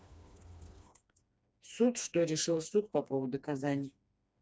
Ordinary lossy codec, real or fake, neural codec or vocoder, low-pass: none; fake; codec, 16 kHz, 2 kbps, FreqCodec, smaller model; none